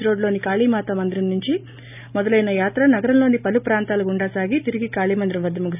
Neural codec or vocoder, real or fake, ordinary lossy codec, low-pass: none; real; none; 3.6 kHz